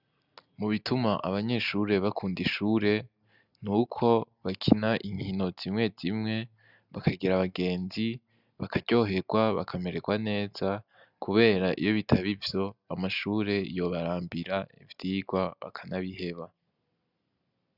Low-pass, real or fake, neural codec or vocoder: 5.4 kHz; real; none